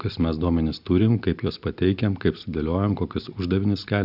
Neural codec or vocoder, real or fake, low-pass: none; real; 5.4 kHz